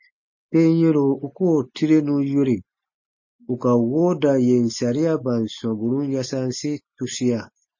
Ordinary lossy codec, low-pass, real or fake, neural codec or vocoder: MP3, 32 kbps; 7.2 kHz; real; none